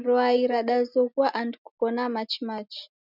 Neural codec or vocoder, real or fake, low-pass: none; real; 5.4 kHz